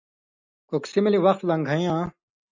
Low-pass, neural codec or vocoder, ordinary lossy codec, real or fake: 7.2 kHz; none; MP3, 64 kbps; real